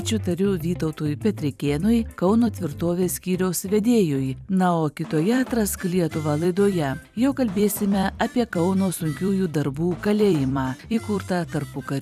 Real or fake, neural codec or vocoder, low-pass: fake; vocoder, 44.1 kHz, 128 mel bands every 256 samples, BigVGAN v2; 14.4 kHz